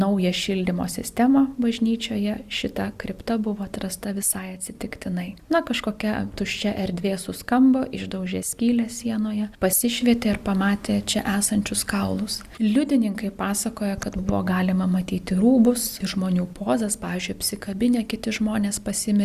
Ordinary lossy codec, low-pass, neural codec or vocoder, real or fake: Opus, 64 kbps; 14.4 kHz; none; real